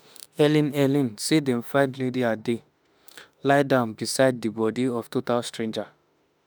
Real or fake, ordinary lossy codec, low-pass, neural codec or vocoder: fake; none; none; autoencoder, 48 kHz, 32 numbers a frame, DAC-VAE, trained on Japanese speech